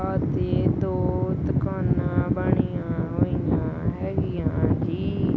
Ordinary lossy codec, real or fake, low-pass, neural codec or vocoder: none; real; none; none